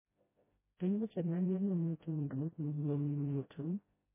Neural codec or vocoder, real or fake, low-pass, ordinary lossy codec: codec, 16 kHz, 0.5 kbps, FreqCodec, smaller model; fake; 3.6 kHz; MP3, 16 kbps